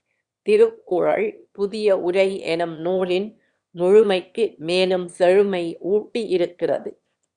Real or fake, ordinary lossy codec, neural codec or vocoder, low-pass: fake; Opus, 64 kbps; autoencoder, 22.05 kHz, a latent of 192 numbers a frame, VITS, trained on one speaker; 9.9 kHz